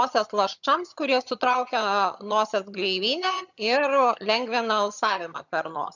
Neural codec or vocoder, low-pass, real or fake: vocoder, 22.05 kHz, 80 mel bands, HiFi-GAN; 7.2 kHz; fake